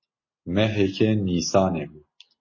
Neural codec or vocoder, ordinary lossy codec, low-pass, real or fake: none; MP3, 32 kbps; 7.2 kHz; real